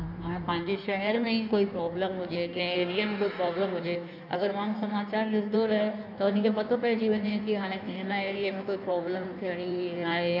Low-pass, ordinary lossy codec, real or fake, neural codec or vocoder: 5.4 kHz; none; fake; codec, 16 kHz in and 24 kHz out, 1.1 kbps, FireRedTTS-2 codec